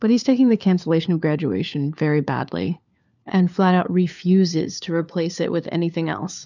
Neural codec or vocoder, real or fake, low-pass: codec, 16 kHz, 4 kbps, FunCodec, trained on LibriTTS, 50 frames a second; fake; 7.2 kHz